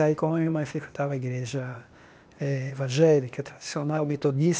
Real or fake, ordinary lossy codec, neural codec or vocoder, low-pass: fake; none; codec, 16 kHz, 0.8 kbps, ZipCodec; none